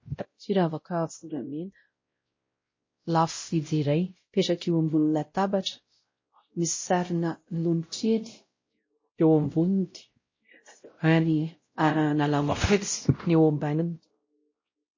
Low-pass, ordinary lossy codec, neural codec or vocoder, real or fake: 7.2 kHz; MP3, 32 kbps; codec, 16 kHz, 0.5 kbps, X-Codec, WavLM features, trained on Multilingual LibriSpeech; fake